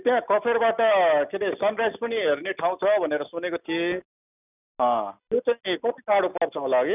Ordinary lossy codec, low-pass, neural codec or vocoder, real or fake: none; 3.6 kHz; none; real